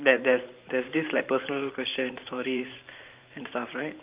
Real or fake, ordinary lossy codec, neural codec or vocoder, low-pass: fake; Opus, 24 kbps; vocoder, 44.1 kHz, 128 mel bands every 512 samples, BigVGAN v2; 3.6 kHz